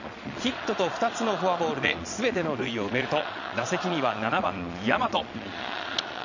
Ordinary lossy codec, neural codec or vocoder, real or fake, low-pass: none; vocoder, 22.05 kHz, 80 mel bands, Vocos; fake; 7.2 kHz